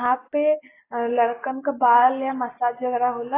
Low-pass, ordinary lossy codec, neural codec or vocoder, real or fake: 3.6 kHz; AAC, 16 kbps; none; real